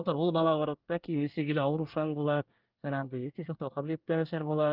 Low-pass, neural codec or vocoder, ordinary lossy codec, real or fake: 5.4 kHz; codec, 24 kHz, 1 kbps, SNAC; Opus, 32 kbps; fake